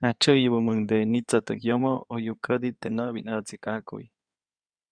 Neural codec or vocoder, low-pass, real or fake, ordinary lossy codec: codec, 16 kHz in and 24 kHz out, 2.2 kbps, FireRedTTS-2 codec; 9.9 kHz; fake; Opus, 64 kbps